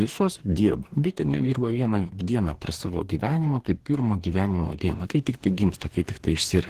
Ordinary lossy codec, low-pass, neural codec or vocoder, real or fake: Opus, 16 kbps; 14.4 kHz; codec, 44.1 kHz, 2.6 kbps, DAC; fake